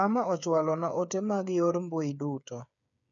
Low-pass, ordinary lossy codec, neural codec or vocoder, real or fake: 7.2 kHz; none; codec, 16 kHz, 8 kbps, FreqCodec, smaller model; fake